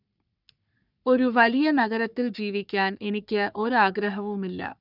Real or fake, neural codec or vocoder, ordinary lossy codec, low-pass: fake; codec, 44.1 kHz, 3.4 kbps, Pupu-Codec; none; 5.4 kHz